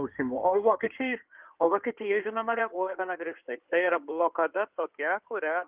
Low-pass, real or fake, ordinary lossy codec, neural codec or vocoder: 3.6 kHz; fake; Opus, 32 kbps; codec, 16 kHz in and 24 kHz out, 2.2 kbps, FireRedTTS-2 codec